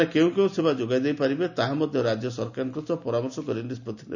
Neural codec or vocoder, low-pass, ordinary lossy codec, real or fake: none; 7.2 kHz; none; real